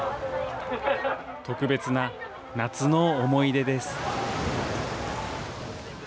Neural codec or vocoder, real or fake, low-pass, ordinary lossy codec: none; real; none; none